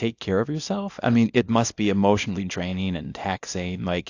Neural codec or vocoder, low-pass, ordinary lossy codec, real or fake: codec, 24 kHz, 0.9 kbps, WavTokenizer, small release; 7.2 kHz; AAC, 48 kbps; fake